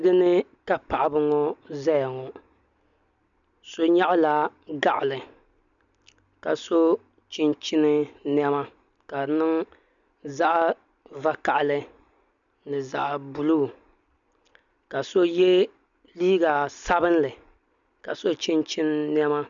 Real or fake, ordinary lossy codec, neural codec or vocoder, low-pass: real; MP3, 96 kbps; none; 7.2 kHz